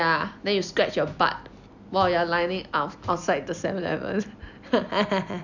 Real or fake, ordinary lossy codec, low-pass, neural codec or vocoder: real; none; 7.2 kHz; none